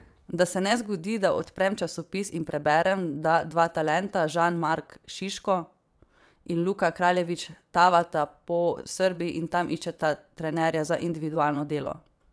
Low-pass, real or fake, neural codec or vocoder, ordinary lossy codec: none; fake; vocoder, 22.05 kHz, 80 mel bands, WaveNeXt; none